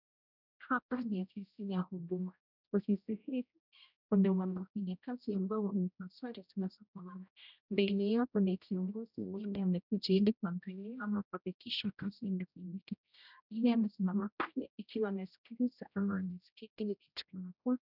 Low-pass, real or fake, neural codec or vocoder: 5.4 kHz; fake; codec, 16 kHz, 0.5 kbps, X-Codec, HuBERT features, trained on general audio